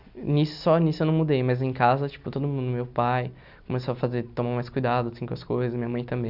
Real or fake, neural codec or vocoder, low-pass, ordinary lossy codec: real; none; 5.4 kHz; none